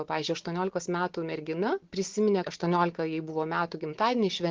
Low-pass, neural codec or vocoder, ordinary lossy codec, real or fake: 7.2 kHz; none; Opus, 16 kbps; real